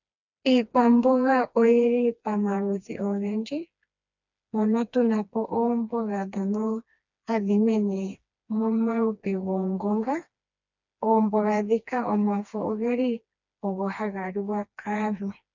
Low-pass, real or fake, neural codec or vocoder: 7.2 kHz; fake; codec, 16 kHz, 2 kbps, FreqCodec, smaller model